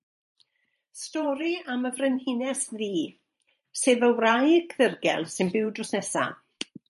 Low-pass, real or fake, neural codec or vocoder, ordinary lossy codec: 14.4 kHz; fake; vocoder, 44.1 kHz, 128 mel bands every 512 samples, BigVGAN v2; MP3, 48 kbps